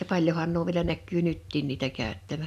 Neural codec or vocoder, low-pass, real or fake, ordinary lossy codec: none; 14.4 kHz; real; none